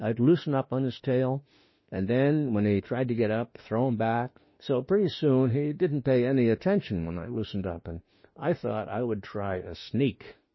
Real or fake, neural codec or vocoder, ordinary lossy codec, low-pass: fake; autoencoder, 48 kHz, 32 numbers a frame, DAC-VAE, trained on Japanese speech; MP3, 24 kbps; 7.2 kHz